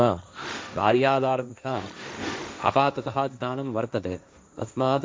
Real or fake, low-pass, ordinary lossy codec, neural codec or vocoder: fake; none; none; codec, 16 kHz, 1.1 kbps, Voila-Tokenizer